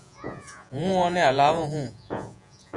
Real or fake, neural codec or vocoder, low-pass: fake; vocoder, 48 kHz, 128 mel bands, Vocos; 10.8 kHz